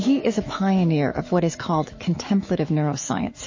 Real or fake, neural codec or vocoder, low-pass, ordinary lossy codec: fake; autoencoder, 48 kHz, 128 numbers a frame, DAC-VAE, trained on Japanese speech; 7.2 kHz; MP3, 32 kbps